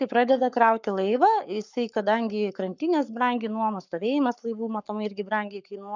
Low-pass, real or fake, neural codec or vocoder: 7.2 kHz; fake; codec, 44.1 kHz, 7.8 kbps, Pupu-Codec